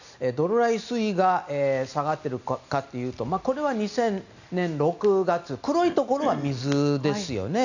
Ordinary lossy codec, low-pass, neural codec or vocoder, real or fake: none; 7.2 kHz; none; real